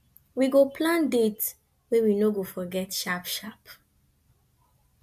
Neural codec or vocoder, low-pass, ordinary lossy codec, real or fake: none; 14.4 kHz; MP3, 64 kbps; real